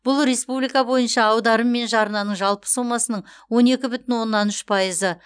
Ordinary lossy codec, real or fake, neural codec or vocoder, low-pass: none; real; none; 9.9 kHz